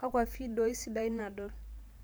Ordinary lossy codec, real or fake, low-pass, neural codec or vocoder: none; fake; none; vocoder, 44.1 kHz, 128 mel bands every 512 samples, BigVGAN v2